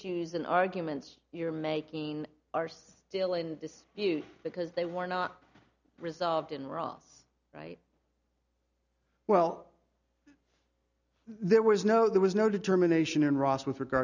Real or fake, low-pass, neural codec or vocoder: real; 7.2 kHz; none